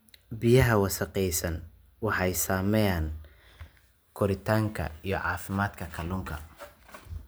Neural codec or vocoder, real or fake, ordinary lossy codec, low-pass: none; real; none; none